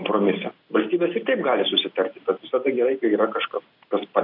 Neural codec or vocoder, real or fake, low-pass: none; real; 5.4 kHz